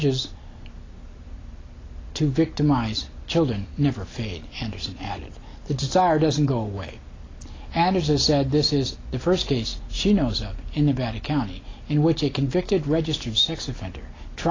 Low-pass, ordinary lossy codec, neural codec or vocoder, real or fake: 7.2 kHz; AAC, 32 kbps; none; real